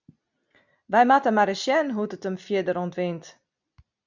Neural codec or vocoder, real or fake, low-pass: none; real; 7.2 kHz